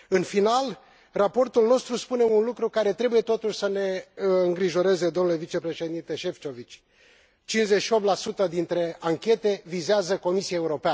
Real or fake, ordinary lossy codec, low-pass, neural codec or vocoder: real; none; none; none